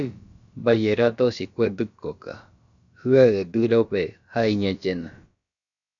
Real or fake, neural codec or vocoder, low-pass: fake; codec, 16 kHz, about 1 kbps, DyCAST, with the encoder's durations; 7.2 kHz